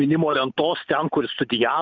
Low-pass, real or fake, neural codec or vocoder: 7.2 kHz; real; none